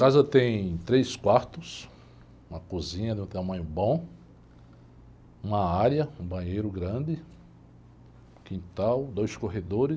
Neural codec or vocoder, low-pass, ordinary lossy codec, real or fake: none; none; none; real